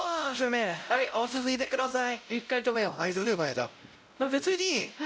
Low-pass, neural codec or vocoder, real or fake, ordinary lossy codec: none; codec, 16 kHz, 0.5 kbps, X-Codec, WavLM features, trained on Multilingual LibriSpeech; fake; none